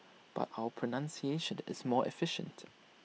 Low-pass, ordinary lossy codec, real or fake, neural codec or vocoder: none; none; real; none